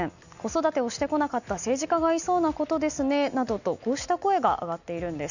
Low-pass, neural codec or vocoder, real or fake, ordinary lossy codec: 7.2 kHz; none; real; none